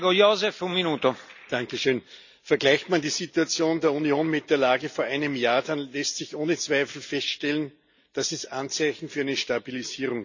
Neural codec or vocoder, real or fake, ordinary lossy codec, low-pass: none; real; none; 7.2 kHz